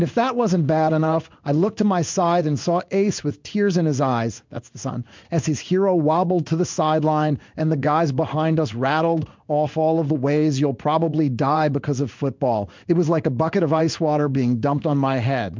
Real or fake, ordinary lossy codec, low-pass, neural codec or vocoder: fake; MP3, 64 kbps; 7.2 kHz; codec, 16 kHz in and 24 kHz out, 1 kbps, XY-Tokenizer